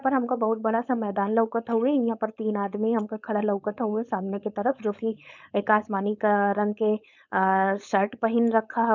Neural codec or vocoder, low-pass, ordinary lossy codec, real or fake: codec, 16 kHz, 4.8 kbps, FACodec; 7.2 kHz; none; fake